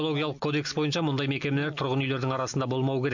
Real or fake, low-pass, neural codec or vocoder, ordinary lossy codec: real; 7.2 kHz; none; none